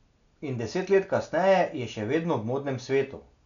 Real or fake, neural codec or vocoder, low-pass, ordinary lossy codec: real; none; 7.2 kHz; none